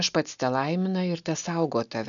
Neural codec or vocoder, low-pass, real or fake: none; 7.2 kHz; real